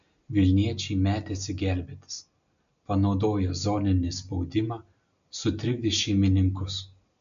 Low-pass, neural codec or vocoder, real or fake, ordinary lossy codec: 7.2 kHz; none; real; AAC, 96 kbps